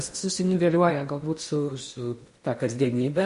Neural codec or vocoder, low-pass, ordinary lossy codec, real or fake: codec, 16 kHz in and 24 kHz out, 0.8 kbps, FocalCodec, streaming, 65536 codes; 10.8 kHz; MP3, 48 kbps; fake